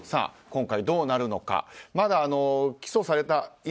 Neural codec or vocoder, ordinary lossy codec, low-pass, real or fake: none; none; none; real